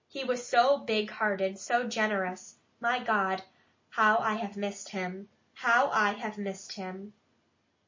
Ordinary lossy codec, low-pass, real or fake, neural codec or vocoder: MP3, 32 kbps; 7.2 kHz; real; none